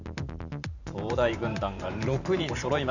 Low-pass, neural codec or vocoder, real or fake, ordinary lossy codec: 7.2 kHz; vocoder, 44.1 kHz, 128 mel bands every 512 samples, BigVGAN v2; fake; none